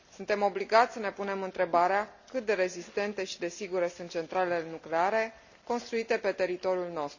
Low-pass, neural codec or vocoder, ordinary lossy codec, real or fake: 7.2 kHz; none; none; real